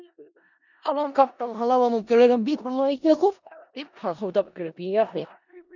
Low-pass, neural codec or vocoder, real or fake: 7.2 kHz; codec, 16 kHz in and 24 kHz out, 0.4 kbps, LongCat-Audio-Codec, four codebook decoder; fake